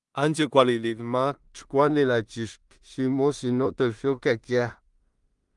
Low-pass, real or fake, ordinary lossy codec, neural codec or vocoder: 10.8 kHz; fake; Opus, 32 kbps; codec, 16 kHz in and 24 kHz out, 0.4 kbps, LongCat-Audio-Codec, two codebook decoder